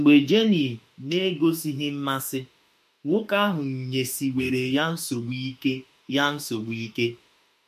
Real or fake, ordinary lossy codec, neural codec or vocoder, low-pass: fake; MP3, 64 kbps; autoencoder, 48 kHz, 32 numbers a frame, DAC-VAE, trained on Japanese speech; 14.4 kHz